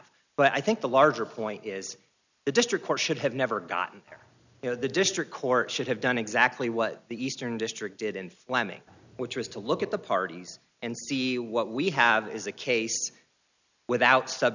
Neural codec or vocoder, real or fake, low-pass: none; real; 7.2 kHz